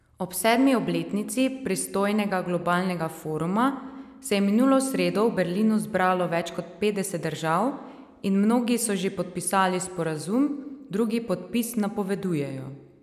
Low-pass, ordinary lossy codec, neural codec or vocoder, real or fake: 14.4 kHz; none; none; real